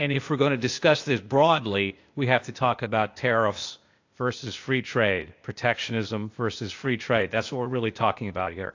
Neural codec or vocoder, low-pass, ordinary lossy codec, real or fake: codec, 16 kHz, 0.8 kbps, ZipCodec; 7.2 kHz; AAC, 48 kbps; fake